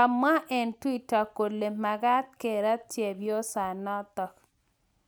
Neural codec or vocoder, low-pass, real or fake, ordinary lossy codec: none; none; real; none